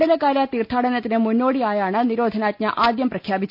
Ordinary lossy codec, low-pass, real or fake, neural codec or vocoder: none; 5.4 kHz; real; none